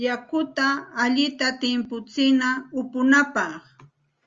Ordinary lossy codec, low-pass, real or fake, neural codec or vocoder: Opus, 32 kbps; 7.2 kHz; real; none